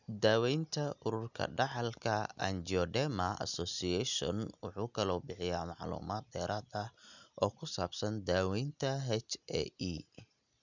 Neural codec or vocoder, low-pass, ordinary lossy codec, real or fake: none; 7.2 kHz; none; real